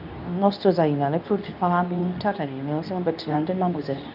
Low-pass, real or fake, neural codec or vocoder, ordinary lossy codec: 5.4 kHz; fake; codec, 24 kHz, 0.9 kbps, WavTokenizer, medium speech release version 2; none